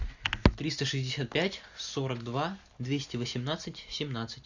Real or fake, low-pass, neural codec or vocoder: real; 7.2 kHz; none